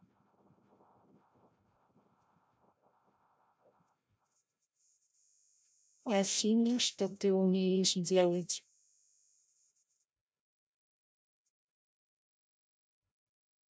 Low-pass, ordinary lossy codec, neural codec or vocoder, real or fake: none; none; codec, 16 kHz, 0.5 kbps, FreqCodec, larger model; fake